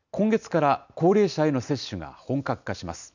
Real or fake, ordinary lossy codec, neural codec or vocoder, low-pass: real; none; none; 7.2 kHz